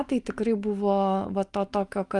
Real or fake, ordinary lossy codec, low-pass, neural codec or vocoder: real; Opus, 32 kbps; 10.8 kHz; none